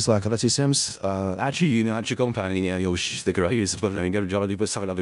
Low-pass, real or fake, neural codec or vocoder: 10.8 kHz; fake; codec, 16 kHz in and 24 kHz out, 0.4 kbps, LongCat-Audio-Codec, four codebook decoder